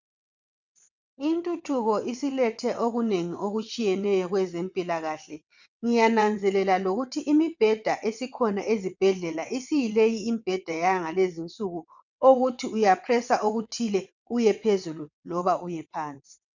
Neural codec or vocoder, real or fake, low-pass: vocoder, 22.05 kHz, 80 mel bands, WaveNeXt; fake; 7.2 kHz